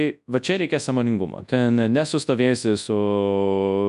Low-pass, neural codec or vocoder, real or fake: 10.8 kHz; codec, 24 kHz, 0.9 kbps, WavTokenizer, large speech release; fake